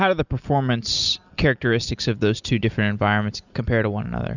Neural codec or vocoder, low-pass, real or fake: none; 7.2 kHz; real